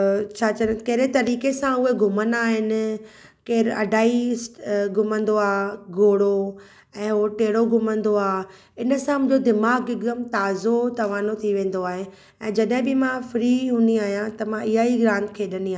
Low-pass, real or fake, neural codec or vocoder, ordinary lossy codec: none; real; none; none